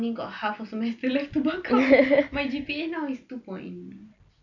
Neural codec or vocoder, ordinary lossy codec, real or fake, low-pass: none; none; real; 7.2 kHz